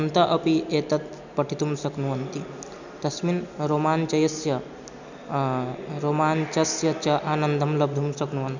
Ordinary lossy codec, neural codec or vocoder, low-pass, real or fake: none; none; 7.2 kHz; real